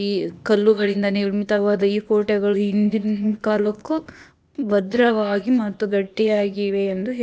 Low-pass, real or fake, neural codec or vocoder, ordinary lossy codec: none; fake; codec, 16 kHz, 0.8 kbps, ZipCodec; none